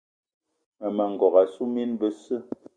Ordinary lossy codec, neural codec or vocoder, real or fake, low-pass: Opus, 64 kbps; none; real; 9.9 kHz